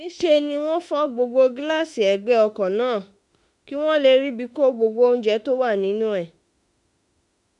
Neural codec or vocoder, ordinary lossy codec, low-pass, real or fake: autoencoder, 48 kHz, 32 numbers a frame, DAC-VAE, trained on Japanese speech; none; 10.8 kHz; fake